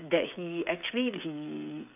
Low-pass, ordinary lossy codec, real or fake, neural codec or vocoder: 3.6 kHz; none; fake; codec, 16 kHz, 16 kbps, FreqCodec, smaller model